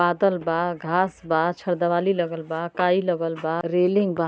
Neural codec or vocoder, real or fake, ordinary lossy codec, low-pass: none; real; none; none